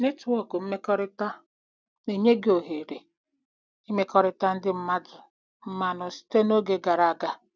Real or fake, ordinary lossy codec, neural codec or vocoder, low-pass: real; none; none; none